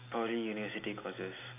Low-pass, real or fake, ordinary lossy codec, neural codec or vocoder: 3.6 kHz; real; none; none